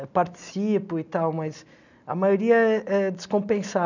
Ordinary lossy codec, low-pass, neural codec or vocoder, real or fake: none; 7.2 kHz; none; real